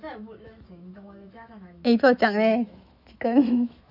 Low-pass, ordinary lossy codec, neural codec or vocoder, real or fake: 5.4 kHz; none; autoencoder, 48 kHz, 128 numbers a frame, DAC-VAE, trained on Japanese speech; fake